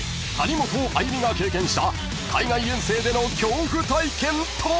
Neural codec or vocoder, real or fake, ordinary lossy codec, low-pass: none; real; none; none